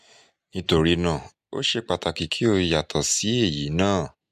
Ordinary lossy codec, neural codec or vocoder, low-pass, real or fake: MP3, 96 kbps; none; 14.4 kHz; real